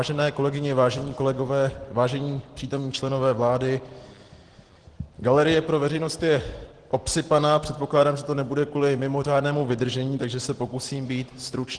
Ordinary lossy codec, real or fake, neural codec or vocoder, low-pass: Opus, 16 kbps; real; none; 9.9 kHz